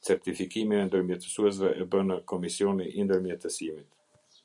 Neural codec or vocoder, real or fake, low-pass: none; real; 10.8 kHz